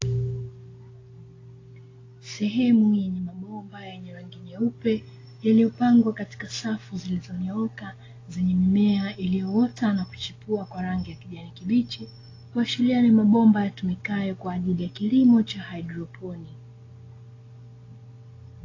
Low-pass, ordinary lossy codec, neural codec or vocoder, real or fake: 7.2 kHz; AAC, 32 kbps; none; real